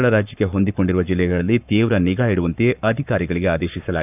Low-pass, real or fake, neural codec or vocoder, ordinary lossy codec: 3.6 kHz; fake; codec, 44.1 kHz, 7.8 kbps, Pupu-Codec; none